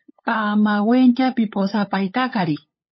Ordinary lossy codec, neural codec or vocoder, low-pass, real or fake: MP3, 24 kbps; codec, 16 kHz, 8 kbps, FunCodec, trained on LibriTTS, 25 frames a second; 7.2 kHz; fake